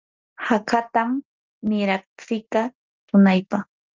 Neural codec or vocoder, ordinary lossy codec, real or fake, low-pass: none; Opus, 32 kbps; real; 7.2 kHz